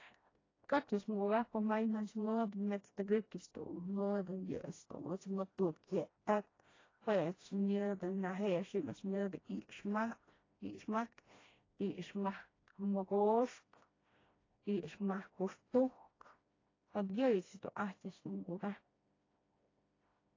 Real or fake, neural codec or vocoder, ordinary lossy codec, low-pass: fake; codec, 16 kHz, 1 kbps, FreqCodec, smaller model; AAC, 32 kbps; 7.2 kHz